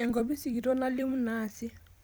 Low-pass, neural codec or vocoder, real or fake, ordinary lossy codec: none; vocoder, 44.1 kHz, 128 mel bands every 256 samples, BigVGAN v2; fake; none